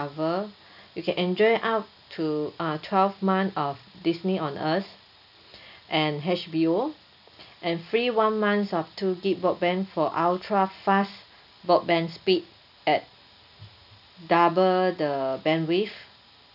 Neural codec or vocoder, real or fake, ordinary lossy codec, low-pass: none; real; none; 5.4 kHz